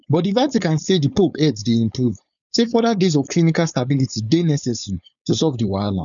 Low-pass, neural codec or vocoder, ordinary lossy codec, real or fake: 7.2 kHz; codec, 16 kHz, 4.8 kbps, FACodec; none; fake